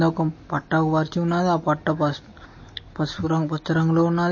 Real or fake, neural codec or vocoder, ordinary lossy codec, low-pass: real; none; MP3, 32 kbps; 7.2 kHz